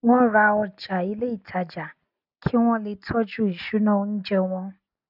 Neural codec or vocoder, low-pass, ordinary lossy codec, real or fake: none; 5.4 kHz; none; real